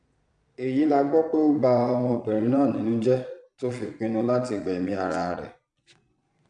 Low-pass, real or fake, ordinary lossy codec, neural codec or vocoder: 9.9 kHz; fake; none; vocoder, 22.05 kHz, 80 mel bands, Vocos